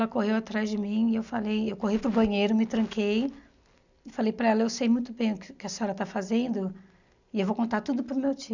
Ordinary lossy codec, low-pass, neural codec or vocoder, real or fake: none; 7.2 kHz; none; real